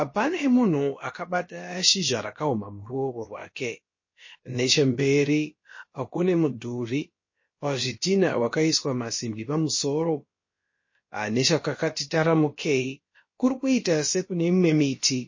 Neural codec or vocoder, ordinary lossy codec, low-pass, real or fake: codec, 16 kHz, about 1 kbps, DyCAST, with the encoder's durations; MP3, 32 kbps; 7.2 kHz; fake